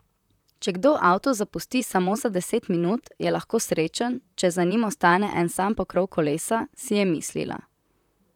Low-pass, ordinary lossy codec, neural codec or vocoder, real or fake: 19.8 kHz; none; vocoder, 44.1 kHz, 128 mel bands, Pupu-Vocoder; fake